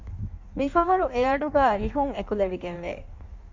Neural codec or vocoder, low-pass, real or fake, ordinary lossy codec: codec, 16 kHz in and 24 kHz out, 1.1 kbps, FireRedTTS-2 codec; 7.2 kHz; fake; MP3, 48 kbps